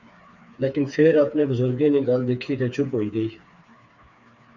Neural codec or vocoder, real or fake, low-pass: codec, 16 kHz, 4 kbps, FreqCodec, smaller model; fake; 7.2 kHz